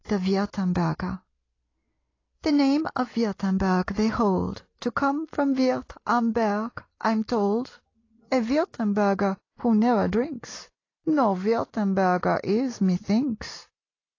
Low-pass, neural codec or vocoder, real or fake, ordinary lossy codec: 7.2 kHz; none; real; AAC, 32 kbps